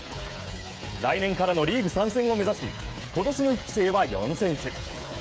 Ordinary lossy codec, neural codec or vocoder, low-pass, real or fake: none; codec, 16 kHz, 8 kbps, FreqCodec, smaller model; none; fake